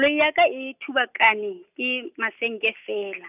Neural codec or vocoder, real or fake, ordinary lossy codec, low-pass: none; real; none; 3.6 kHz